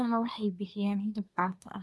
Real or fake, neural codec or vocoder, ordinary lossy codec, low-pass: fake; codec, 24 kHz, 1 kbps, SNAC; none; none